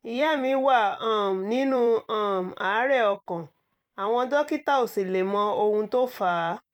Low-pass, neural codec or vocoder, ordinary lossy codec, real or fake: 19.8 kHz; vocoder, 44.1 kHz, 128 mel bands every 256 samples, BigVGAN v2; none; fake